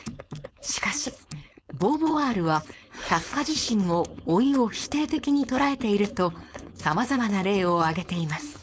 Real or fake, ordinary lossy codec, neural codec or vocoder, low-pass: fake; none; codec, 16 kHz, 4.8 kbps, FACodec; none